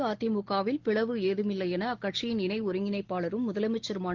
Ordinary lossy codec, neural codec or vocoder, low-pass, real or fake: Opus, 16 kbps; none; 7.2 kHz; real